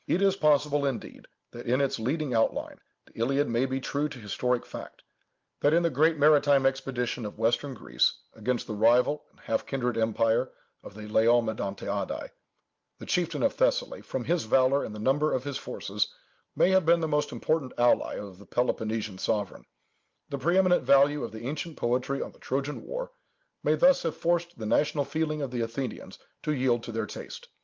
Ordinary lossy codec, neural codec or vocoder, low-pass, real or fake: Opus, 32 kbps; none; 7.2 kHz; real